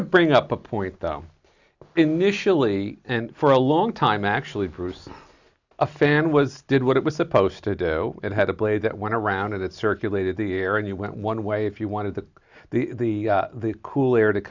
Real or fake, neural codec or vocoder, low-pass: real; none; 7.2 kHz